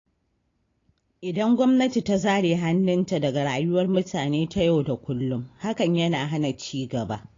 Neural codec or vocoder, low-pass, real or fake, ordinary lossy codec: none; 7.2 kHz; real; AAC, 32 kbps